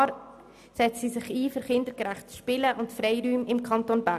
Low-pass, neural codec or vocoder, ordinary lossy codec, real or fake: 14.4 kHz; none; none; real